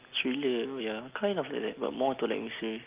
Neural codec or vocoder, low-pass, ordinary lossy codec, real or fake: none; 3.6 kHz; Opus, 32 kbps; real